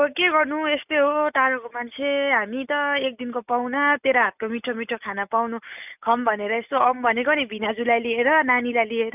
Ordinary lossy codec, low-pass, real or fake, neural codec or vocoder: none; 3.6 kHz; real; none